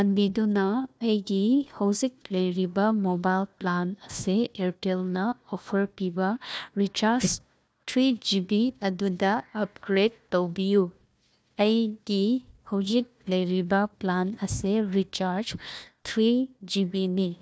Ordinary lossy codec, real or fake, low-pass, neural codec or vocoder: none; fake; none; codec, 16 kHz, 1 kbps, FunCodec, trained on Chinese and English, 50 frames a second